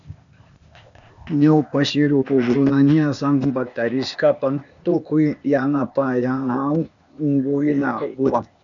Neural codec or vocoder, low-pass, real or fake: codec, 16 kHz, 0.8 kbps, ZipCodec; 7.2 kHz; fake